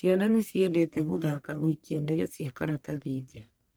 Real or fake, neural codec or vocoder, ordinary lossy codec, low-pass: fake; codec, 44.1 kHz, 1.7 kbps, Pupu-Codec; none; none